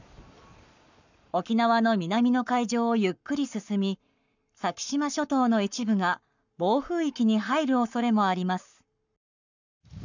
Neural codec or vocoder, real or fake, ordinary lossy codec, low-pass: codec, 44.1 kHz, 7.8 kbps, Pupu-Codec; fake; none; 7.2 kHz